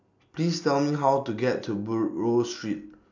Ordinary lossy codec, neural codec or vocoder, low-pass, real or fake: none; none; 7.2 kHz; real